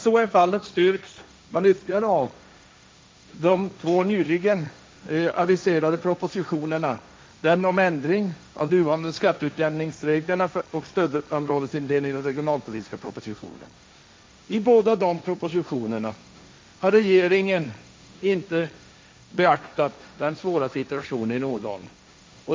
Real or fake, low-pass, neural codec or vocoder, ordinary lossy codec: fake; none; codec, 16 kHz, 1.1 kbps, Voila-Tokenizer; none